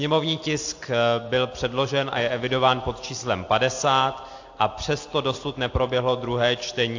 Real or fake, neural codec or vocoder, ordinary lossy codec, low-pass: real; none; AAC, 48 kbps; 7.2 kHz